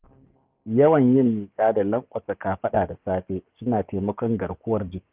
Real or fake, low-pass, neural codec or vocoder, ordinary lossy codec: fake; 3.6 kHz; codec, 44.1 kHz, 7.8 kbps, DAC; Opus, 32 kbps